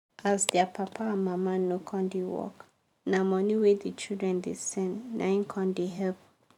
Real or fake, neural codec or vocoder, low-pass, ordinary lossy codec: fake; vocoder, 44.1 kHz, 128 mel bands every 512 samples, BigVGAN v2; 19.8 kHz; none